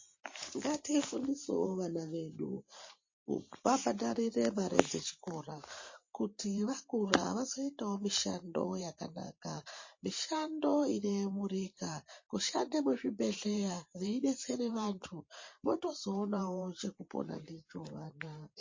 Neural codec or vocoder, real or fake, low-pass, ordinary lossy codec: vocoder, 44.1 kHz, 128 mel bands every 512 samples, BigVGAN v2; fake; 7.2 kHz; MP3, 32 kbps